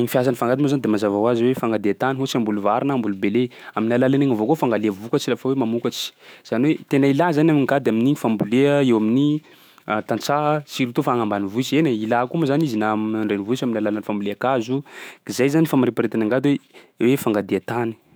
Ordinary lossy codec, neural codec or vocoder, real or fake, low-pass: none; autoencoder, 48 kHz, 128 numbers a frame, DAC-VAE, trained on Japanese speech; fake; none